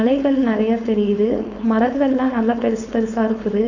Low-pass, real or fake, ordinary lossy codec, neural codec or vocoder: 7.2 kHz; fake; none; codec, 16 kHz, 4.8 kbps, FACodec